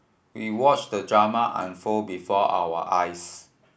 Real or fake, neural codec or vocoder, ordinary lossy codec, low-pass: real; none; none; none